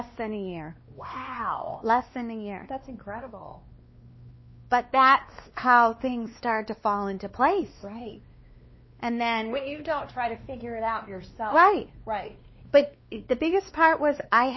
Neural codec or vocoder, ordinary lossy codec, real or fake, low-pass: codec, 16 kHz, 2 kbps, X-Codec, WavLM features, trained on Multilingual LibriSpeech; MP3, 24 kbps; fake; 7.2 kHz